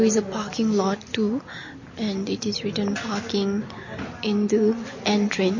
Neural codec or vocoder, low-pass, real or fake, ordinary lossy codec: none; 7.2 kHz; real; MP3, 32 kbps